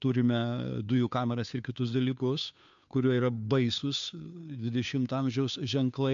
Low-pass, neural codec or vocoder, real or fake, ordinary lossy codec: 7.2 kHz; codec, 16 kHz, 2 kbps, FunCodec, trained on Chinese and English, 25 frames a second; fake; MP3, 64 kbps